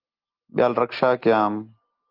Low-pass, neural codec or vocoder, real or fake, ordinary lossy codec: 5.4 kHz; none; real; Opus, 32 kbps